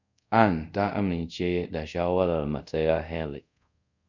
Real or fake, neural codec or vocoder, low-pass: fake; codec, 24 kHz, 0.5 kbps, DualCodec; 7.2 kHz